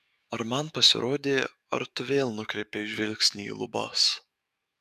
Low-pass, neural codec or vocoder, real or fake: 14.4 kHz; codec, 44.1 kHz, 7.8 kbps, DAC; fake